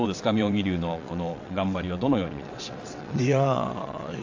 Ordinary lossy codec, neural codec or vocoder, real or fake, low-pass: AAC, 48 kbps; vocoder, 22.05 kHz, 80 mel bands, WaveNeXt; fake; 7.2 kHz